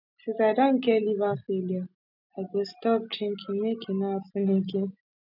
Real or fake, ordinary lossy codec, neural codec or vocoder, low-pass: real; none; none; 5.4 kHz